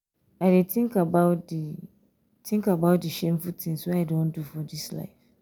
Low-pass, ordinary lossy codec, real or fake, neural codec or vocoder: none; none; real; none